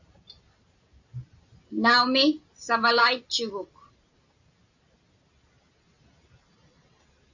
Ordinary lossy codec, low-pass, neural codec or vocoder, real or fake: AAC, 48 kbps; 7.2 kHz; none; real